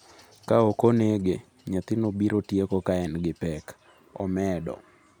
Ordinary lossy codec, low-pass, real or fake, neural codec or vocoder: none; none; real; none